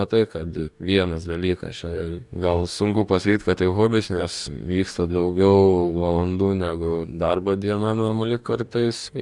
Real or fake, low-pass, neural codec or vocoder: fake; 10.8 kHz; codec, 44.1 kHz, 2.6 kbps, DAC